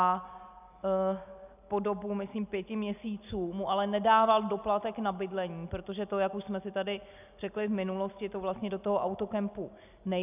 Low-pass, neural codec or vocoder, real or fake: 3.6 kHz; none; real